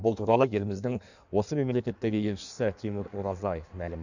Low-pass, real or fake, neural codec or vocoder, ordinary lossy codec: 7.2 kHz; fake; codec, 16 kHz in and 24 kHz out, 1.1 kbps, FireRedTTS-2 codec; none